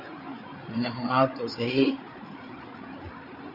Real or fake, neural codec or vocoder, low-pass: fake; codec, 16 kHz, 8 kbps, FreqCodec, larger model; 5.4 kHz